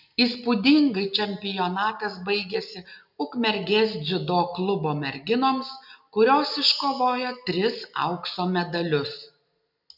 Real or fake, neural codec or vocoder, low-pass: real; none; 5.4 kHz